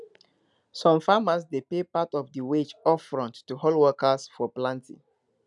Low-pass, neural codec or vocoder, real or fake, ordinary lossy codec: 10.8 kHz; none; real; none